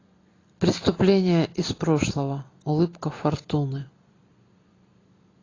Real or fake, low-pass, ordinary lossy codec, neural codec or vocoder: real; 7.2 kHz; AAC, 32 kbps; none